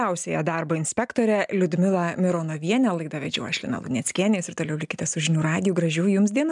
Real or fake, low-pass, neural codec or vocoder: real; 10.8 kHz; none